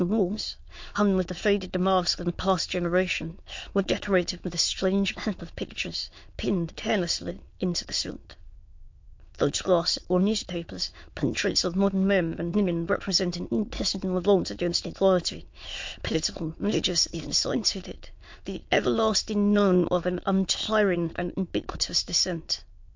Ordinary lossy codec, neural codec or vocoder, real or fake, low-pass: MP3, 48 kbps; autoencoder, 22.05 kHz, a latent of 192 numbers a frame, VITS, trained on many speakers; fake; 7.2 kHz